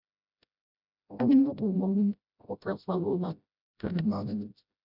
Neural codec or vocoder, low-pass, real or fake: codec, 16 kHz, 0.5 kbps, FreqCodec, smaller model; 5.4 kHz; fake